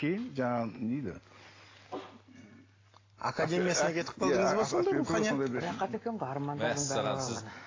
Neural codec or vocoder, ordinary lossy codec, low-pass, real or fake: none; AAC, 32 kbps; 7.2 kHz; real